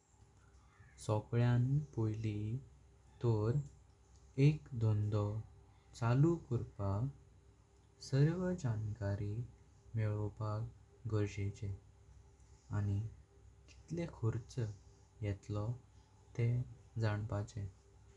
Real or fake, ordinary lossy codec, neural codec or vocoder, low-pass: real; none; none; 10.8 kHz